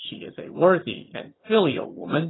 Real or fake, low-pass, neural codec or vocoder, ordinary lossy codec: fake; 7.2 kHz; vocoder, 22.05 kHz, 80 mel bands, HiFi-GAN; AAC, 16 kbps